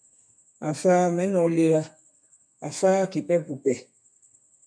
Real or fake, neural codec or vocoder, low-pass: fake; codec, 32 kHz, 1.9 kbps, SNAC; 9.9 kHz